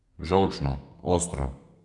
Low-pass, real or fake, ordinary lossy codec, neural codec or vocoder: 10.8 kHz; fake; none; codec, 32 kHz, 1.9 kbps, SNAC